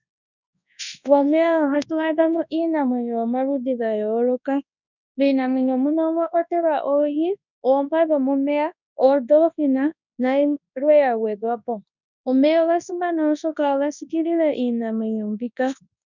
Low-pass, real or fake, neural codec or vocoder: 7.2 kHz; fake; codec, 24 kHz, 0.9 kbps, WavTokenizer, large speech release